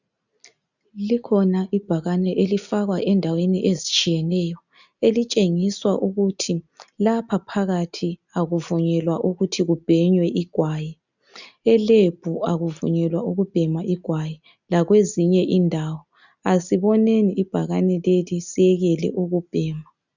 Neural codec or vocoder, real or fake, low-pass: none; real; 7.2 kHz